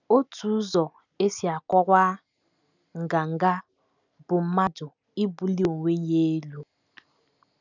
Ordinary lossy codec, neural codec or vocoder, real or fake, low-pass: none; none; real; 7.2 kHz